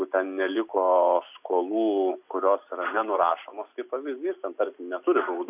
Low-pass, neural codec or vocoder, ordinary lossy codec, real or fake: 3.6 kHz; none; AAC, 32 kbps; real